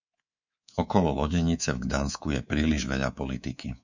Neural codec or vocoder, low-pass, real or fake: codec, 24 kHz, 3.1 kbps, DualCodec; 7.2 kHz; fake